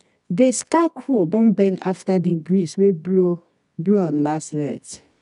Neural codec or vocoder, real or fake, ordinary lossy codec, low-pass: codec, 24 kHz, 0.9 kbps, WavTokenizer, medium music audio release; fake; none; 10.8 kHz